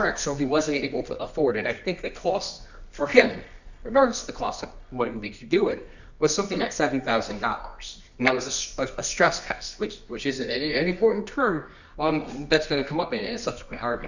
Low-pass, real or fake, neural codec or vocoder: 7.2 kHz; fake; codec, 24 kHz, 0.9 kbps, WavTokenizer, medium music audio release